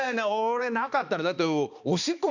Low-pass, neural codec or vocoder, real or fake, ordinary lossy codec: 7.2 kHz; codec, 16 kHz, 2 kbps, X-Codec, HuBERT features, trained on balanced general audio; fake; none